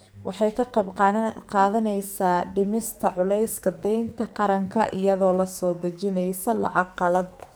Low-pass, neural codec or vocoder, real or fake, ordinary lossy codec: none; codec, 44.1 kHz, 2.6 kbps, SNAC; fake; none